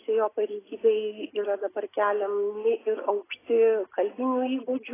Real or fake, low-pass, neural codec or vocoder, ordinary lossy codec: real; 3.6 kHz; none; AAC, 16 kbps